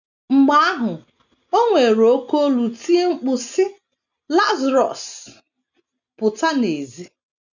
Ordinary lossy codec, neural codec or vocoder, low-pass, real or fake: none; none; 7.2 kHz; real